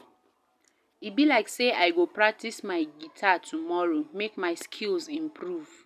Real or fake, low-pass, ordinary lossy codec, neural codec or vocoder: real; 14.4 kHz; none; none